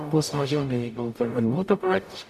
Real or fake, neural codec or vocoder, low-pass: fake; codec, 44.1 kHz, 0.9 kbps, DAC; 14.4 kHz